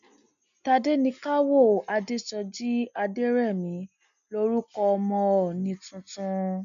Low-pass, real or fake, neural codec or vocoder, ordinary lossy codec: 7.2 kHz; real; none; none